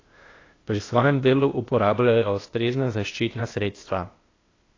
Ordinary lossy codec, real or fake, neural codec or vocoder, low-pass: AAC, 32 kbps; fake; codec, 16 kHz, 0.8 kbps, ZipCodec; 7.2 kHz